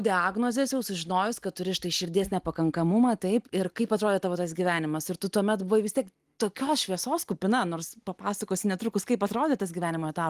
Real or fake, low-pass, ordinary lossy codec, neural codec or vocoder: real; 14.4 kHz; Opus, 24 kbps; none